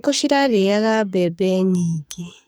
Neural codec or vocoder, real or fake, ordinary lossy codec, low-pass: codec, 44.1 kHz, 2.6 kbps, SNAC; fake; none; none